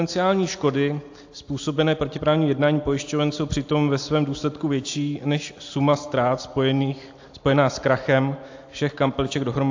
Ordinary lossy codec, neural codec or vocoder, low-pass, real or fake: AAC, 48 kbps; none; 7.2 kHz; real